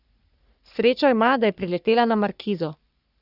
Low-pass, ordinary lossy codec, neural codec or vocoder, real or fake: 5.4 kHz; Opus, 64 kbps; codec, 44.1 kHz, 3.4 kbps, Pupu-Codec; fake